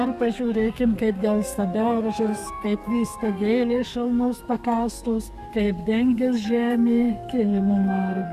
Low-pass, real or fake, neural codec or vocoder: 14.4 kHz; fake; codec, 32 kHz, 1.9 kbps, SNAC